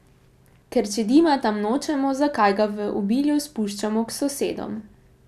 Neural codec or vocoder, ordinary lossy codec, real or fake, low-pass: none; none; real; 14.4 kHz